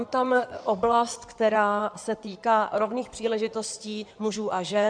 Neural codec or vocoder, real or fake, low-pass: codec, 16 kHz in and 24 kHz out, 2.2 kbps, FireRedTTS-2 codec; fake; 9.9 kHz